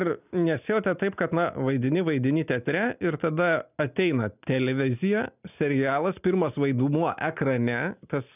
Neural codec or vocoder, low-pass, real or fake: none; 3.6 kHz; real